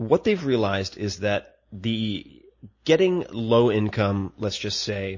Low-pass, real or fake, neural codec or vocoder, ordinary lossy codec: 7.2 kHz; real; none; MP3, 32 kbps